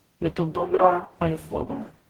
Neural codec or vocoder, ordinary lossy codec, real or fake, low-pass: codec, 44.1 kHz, 0.9 kbps, DAC; Opus, 16 kbps; fake; 19.8 kHz